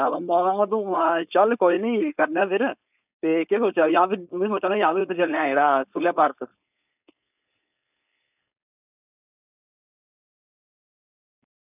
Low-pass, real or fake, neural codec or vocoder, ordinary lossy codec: 3.6 kHz; fake; codec, 16 kHz, 4.8 kbps, FACodec; none